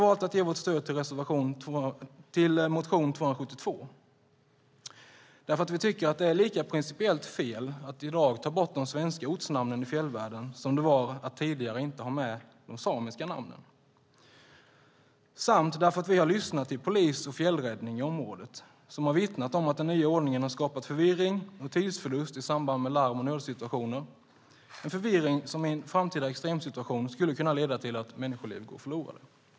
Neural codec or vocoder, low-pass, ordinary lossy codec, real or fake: none; none; none; real